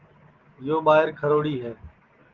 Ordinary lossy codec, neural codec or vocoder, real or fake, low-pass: Opus, 16 kbps; none; real; 7.2 kHz